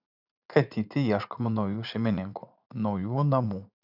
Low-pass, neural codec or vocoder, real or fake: 5.4 kHz; vocoder, 44.1 kHz, 80 mel bands, Vocos; fake